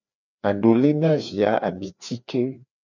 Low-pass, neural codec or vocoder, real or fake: 7.2 kHz; codec, 16 kHz, 2 kbps, FreqCodec, larger model; fake